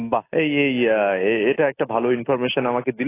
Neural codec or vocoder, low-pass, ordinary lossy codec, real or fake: none; 3.6 kHz; AAC, 16 kbps; real